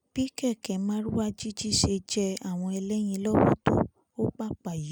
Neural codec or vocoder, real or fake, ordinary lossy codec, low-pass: none; real; none; none